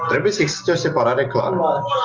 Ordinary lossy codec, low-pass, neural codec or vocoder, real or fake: Opus, 32 kbps; 7.2 kHz; none; real